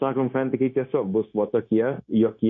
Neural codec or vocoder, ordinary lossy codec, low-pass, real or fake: codec, 16 kHz, 0.9 kbps, LongCat-Audio-Codec; MP3, 48 kbps; 7.2 kHz; fake